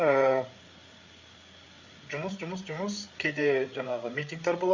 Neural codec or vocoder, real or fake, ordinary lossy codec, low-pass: codec, 16 kHz, 8 kbps, FreqCodec, larger model; fake; Opus, 64 kbps; 7.2 kHz